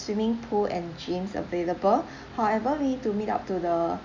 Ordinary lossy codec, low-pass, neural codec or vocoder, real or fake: none; 7.2 kHz; none; real